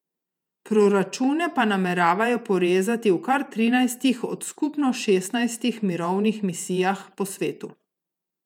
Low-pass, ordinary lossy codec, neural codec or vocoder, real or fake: 19.8 kHz; none; vocoder, 48 kHz, 128 mel bands, Vocos; fake